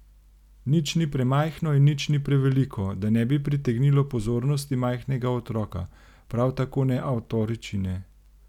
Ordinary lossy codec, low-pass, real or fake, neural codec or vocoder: none; 19.8 kHz; real; none